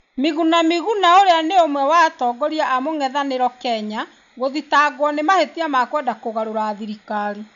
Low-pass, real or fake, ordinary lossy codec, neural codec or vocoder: 7.2 kHz; real; none; none